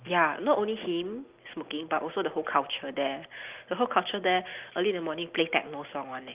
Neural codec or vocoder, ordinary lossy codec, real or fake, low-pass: none; Opus, 32 kbps; real; 3.6 kHz